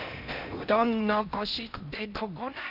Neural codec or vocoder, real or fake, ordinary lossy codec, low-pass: codec, 16 kHz in and 24 kHz out, 0.8 kbps, FocalCodec, streaming, 65536 codes; fake; none; 5.4 kHz